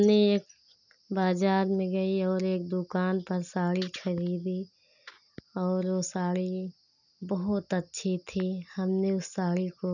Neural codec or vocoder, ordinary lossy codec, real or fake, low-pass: none; none; real; 7.2 kHz